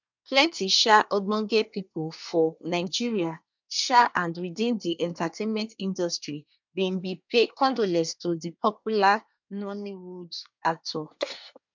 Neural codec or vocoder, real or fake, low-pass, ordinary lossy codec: codec, 24 kHz, 1 kbps, SNAC; fake; 7.2 kHz; MP3, 64 kbps